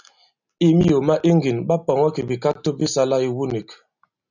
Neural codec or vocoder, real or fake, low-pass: none; real; 7.2 kHz